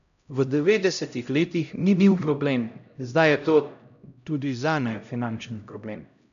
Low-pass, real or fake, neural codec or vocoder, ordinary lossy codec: 7.2 kHz; fake; codec, 16 kHz, 0.5 kbps, X-Codec, HuBERT features, trained on LibriSpeech; none